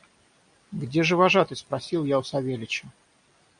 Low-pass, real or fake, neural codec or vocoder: 9.9 kHz; real; none